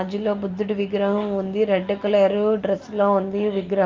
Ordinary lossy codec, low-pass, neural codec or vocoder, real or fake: Opus, 24 kbps; 7.2 kHz; none; real